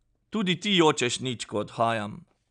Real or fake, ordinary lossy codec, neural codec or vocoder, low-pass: real; none; none; 9.9 kHz